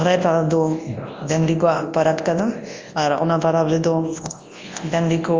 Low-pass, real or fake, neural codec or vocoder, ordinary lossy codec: 7.2 kHz; fake; codec, 24 kHz, 0.9 kbps, WavTokenizer, large speech release; Opus, 32 kbps